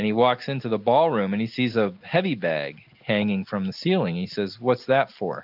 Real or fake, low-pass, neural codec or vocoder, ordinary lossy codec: real; 5.4 kHz; none; AAC, 48 kbps